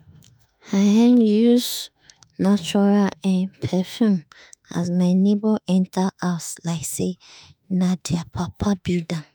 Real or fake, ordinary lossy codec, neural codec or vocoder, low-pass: fake; none; autoencoder, 48 kHz, 32 numbers a frame, DAC-VAE, trained on Japanese speech; none